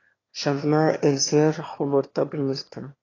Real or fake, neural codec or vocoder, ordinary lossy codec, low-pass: fake; autoencoder, 22.05 kHz, a latent of 192 numbers a frame, VITS, trained on one speaker; AAC, 32 kbps; 7.2 kHz